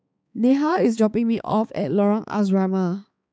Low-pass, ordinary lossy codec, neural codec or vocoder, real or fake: none; none; codec, 16 kHz, 4 kbps, X-Codec, HuBERT features, trained on balanced general audio; fake